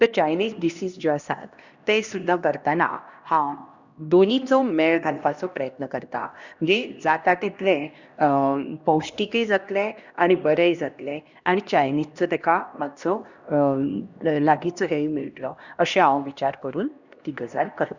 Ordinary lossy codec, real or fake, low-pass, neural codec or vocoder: Opus, 64 kbps; fake; 7.2 kHz; codec, 16 kHz, 1 kbps, X-Codec, HuBERT features, trained on LibriSpeech